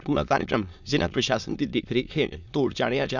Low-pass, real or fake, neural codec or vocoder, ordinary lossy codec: 7.2 kHz; fake; autoencoder, 22.05 kHz, a latent of 192 numbers a frame, VITS, trained on many speakers; none